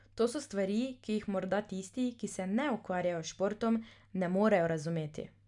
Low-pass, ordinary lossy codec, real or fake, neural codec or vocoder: 10.8 kHz; none; real; none